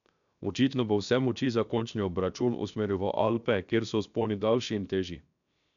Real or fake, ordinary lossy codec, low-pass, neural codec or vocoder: fake; none; 7.2 kHz; codec, 16 kHz, 0.7 kbps, FocalCodec